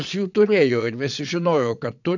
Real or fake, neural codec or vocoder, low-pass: fake; vocoder, 22.05 kHz, 80 mel bands, Vocos; 7.2 kHz